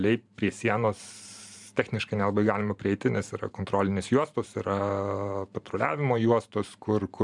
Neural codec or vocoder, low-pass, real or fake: vocoder, 44.1 kHz, 128 mel bands every 512 samples, BigVGAN v2; 10.8 kHz; fake